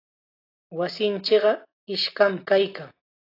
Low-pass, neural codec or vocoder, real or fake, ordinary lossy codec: 5.4 kHz; none; real; AAC, 24 kbps